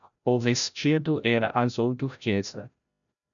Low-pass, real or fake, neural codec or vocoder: 7.2 kHz; fake; codec, 16 kHz, 0.5 kbps, FreqCodec, larger model